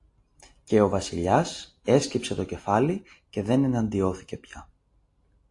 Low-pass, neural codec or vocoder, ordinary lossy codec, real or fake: 10.8 kHz; none; AAC, 48 kbps; real